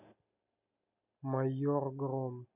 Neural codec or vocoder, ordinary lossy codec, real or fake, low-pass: none; none; real; 3.6 kHz